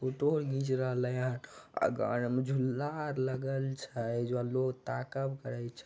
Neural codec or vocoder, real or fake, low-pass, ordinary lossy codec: none; real; none; none